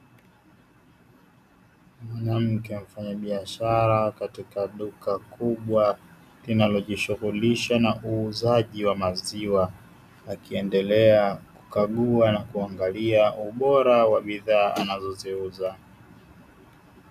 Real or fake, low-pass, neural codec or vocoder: real; 14.4 kHz; none